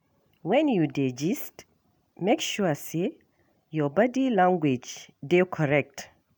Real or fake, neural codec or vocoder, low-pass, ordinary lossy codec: real; none; none; none